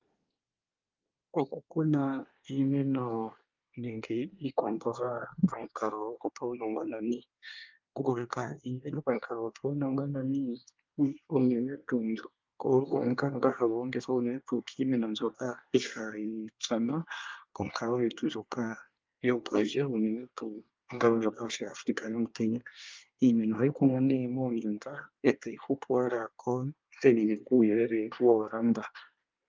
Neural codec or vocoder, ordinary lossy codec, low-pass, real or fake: codec, 24 kHz, 1 kbps, SNAC; Opus, 24 kbps; 7.2 kHz; fake